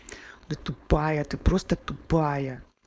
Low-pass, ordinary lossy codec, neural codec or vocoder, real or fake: none; none; codec, 16 kHz, 4.8 kbps, FACodec; fake